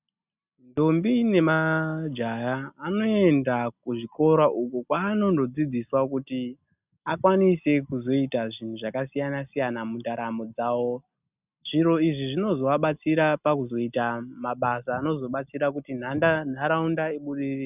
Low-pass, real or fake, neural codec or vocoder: 3.6 kHz; real; none